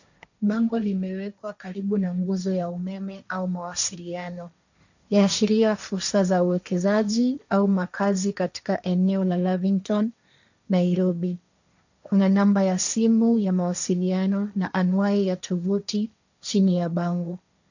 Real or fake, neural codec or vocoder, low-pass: fake; codec, 16 kHz, 1.1 kbps, Voila-Tokenizer; 7.2 kHz